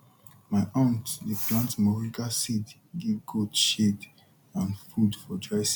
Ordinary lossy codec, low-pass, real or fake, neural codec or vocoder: none; none; real; none